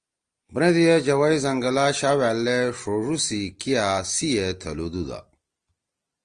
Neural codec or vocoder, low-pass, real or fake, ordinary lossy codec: none; 9.9 kHz; real; Opus, 24 kbps